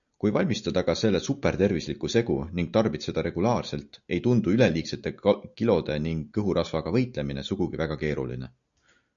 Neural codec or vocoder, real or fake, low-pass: none; real; 7.2 kHz